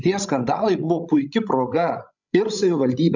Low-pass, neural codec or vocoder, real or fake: 7.2 kHz; codec, 16 kHz, 16 kbps, FreqCodec, larger model; fake